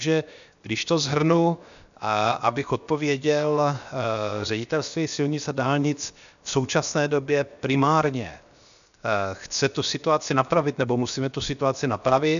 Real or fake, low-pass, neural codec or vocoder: fake; 7.2 kHz; codec, 16 kHz, 0.7 kbps, FocalCodec